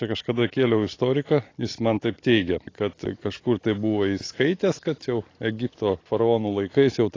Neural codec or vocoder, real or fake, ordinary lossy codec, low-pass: none; real; AAC, 32 kbps; 7.2 kHz